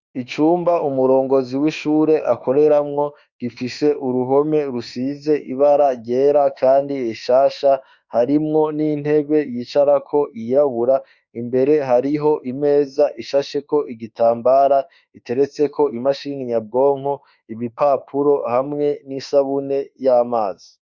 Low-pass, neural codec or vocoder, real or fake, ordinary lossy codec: 7.2 kHz; autoencoder, 48 kHz, 32 numbers a frame, DAC-VAE, trained on Japanese speech; fake; Opus, 64 kbps